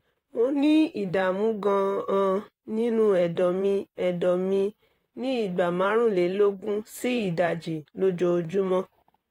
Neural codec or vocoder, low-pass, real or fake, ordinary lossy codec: vocoder, 44.1 kHz, 128 mel bands, Pupu-Vocoder; 19.8 kHz; fake; AAC, 48 kbps